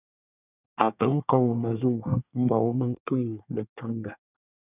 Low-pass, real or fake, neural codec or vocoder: 3.6 kHz; fake; codec, 24 kHz, 1 kbps, SNAC